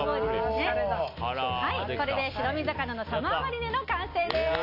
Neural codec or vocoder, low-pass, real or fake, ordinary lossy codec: none; 5.4 kHz; real; AAC, 32 kbps